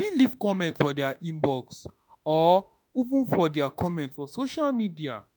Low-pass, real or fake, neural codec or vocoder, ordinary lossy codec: none; fake; autoencoder, 48 kHz, 32 numbers a frame, DAC-VAE, trained on Japanese speech; none